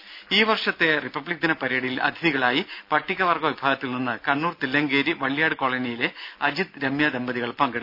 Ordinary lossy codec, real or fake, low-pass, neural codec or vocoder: none; real; 5.4 kHz; none